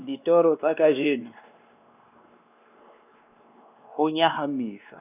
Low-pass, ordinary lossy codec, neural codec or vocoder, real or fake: 3.6 kHz; none; codec, 16 kHz, 2 kbps, X-Codec, WavLM features, trained on Multilingual LibriSpeech; fake